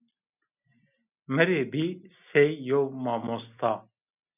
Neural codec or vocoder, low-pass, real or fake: none; 3.6 kHz; real